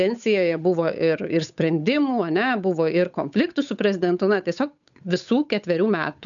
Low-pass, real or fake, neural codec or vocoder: 7.2 kHz; fake; codec, 16 kHz, 8 kbps, FunCodec, trained on Chinese and English, 25 frames a second